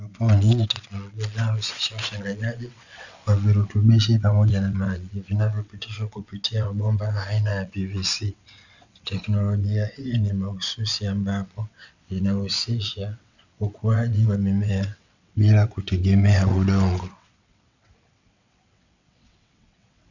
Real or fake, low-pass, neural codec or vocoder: fake; 7.2 kHz; vocoder, 22.05 kHz, 80 mel bands, WaveNeXt